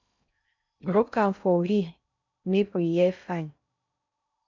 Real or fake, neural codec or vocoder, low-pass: fake; codec, 16 kHz in and 24 kHz out, 0.6 kbps, FocalCodec, streaming, 2048 codes; 7.2 kHz